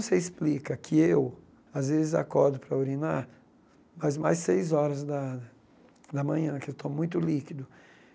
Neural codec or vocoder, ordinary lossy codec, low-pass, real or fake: none; none; none; real